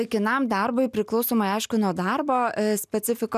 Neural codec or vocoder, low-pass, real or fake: none; 14.4 kHz; real